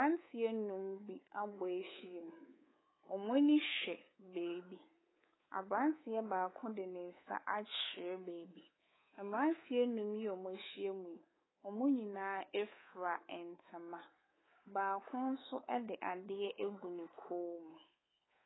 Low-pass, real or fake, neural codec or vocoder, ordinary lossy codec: 7.2 kHz; fake; codec, 24 kHz, 3.1 kbps, DualCodec; AAC, 16 kbps